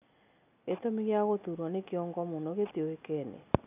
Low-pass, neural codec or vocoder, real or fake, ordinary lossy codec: 3.6 kHz; none; real; none